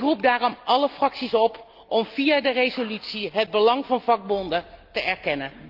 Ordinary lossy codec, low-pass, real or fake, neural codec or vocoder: Opus, 32 kbps; 5.4 kHz; real; none